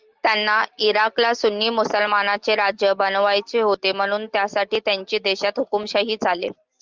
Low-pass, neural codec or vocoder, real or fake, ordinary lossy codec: 7.2 kHz; none; real; Opus, 24 kbps